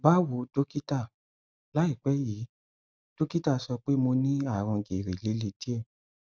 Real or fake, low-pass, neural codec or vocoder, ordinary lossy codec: real; none; none; none